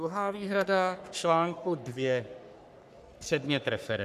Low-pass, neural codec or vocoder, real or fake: 14.4 kHz; codec, 44.1 kHz, 3.4 kbps, Pupu-Codec; fake